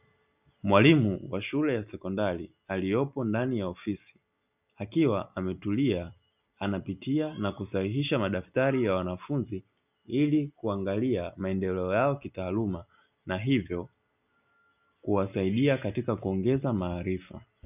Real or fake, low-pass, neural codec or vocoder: real; 3.6 kHz; none